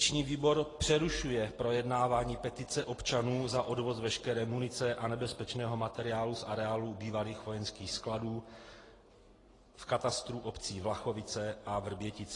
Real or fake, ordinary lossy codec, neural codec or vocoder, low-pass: real; AAC, 32 kbps; none; 10.8 kHz